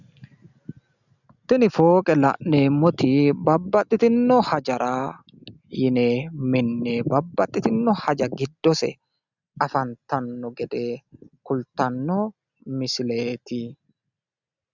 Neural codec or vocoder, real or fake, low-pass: none; real; 7.2 kHz